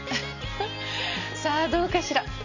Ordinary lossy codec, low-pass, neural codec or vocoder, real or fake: none; 7.2 kHz; none; real